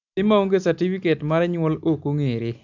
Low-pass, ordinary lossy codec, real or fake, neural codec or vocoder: 7.2 kHz; none; real; none